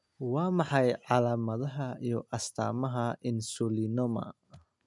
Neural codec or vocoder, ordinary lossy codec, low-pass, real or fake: none; none; 10.8 kHz; real